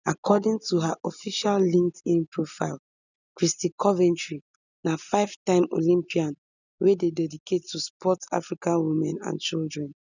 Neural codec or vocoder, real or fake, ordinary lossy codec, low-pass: none; real; none; 7.2 kHz